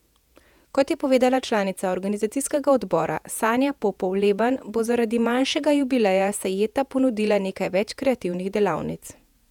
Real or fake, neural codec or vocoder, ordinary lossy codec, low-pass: fake; vocoder, 48 kHz, 128 mel bands, Vocos; none; 19.8 kHz